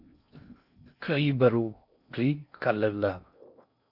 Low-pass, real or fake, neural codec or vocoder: 5.4 kHz; fake; codec, 16 kHz in and 24 kHz out, 0.6 kbps, FocalCodec, streaming, 2048 codes